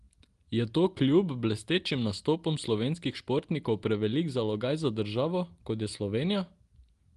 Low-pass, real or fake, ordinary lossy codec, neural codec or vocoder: 10.8 kHz; real; Opus, 24 kbps; none